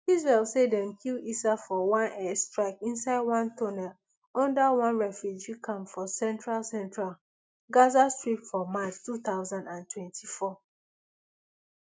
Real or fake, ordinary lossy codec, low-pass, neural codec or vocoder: real; none; none; none